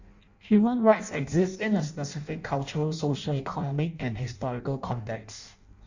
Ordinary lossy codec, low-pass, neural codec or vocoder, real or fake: Opus, 64 kbps; 7.2 kHz; codec, 16 kHz in and 24 kHz out, 0.6 kbps, FireRedTTS-2 codec; fake